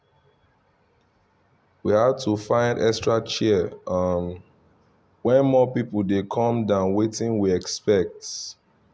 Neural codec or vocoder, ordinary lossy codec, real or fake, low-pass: none; none; real; none